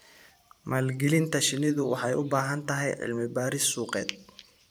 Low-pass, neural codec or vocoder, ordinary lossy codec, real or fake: none; vocoder, 44.1 kHz, 128 mel bands every 512 samples, BigVGAN v2; none; fake